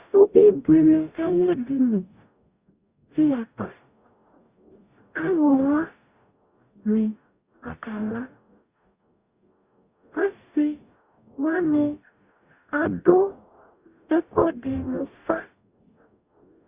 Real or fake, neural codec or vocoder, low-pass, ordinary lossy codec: fake; codec, 44.1 kHz, 0.9 kbps, DAC; 3.6 kHz; Opus, 64 kbps